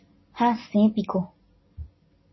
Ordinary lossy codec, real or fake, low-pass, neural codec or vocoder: MP3, 24 kbps; real; 7.2 kHz; none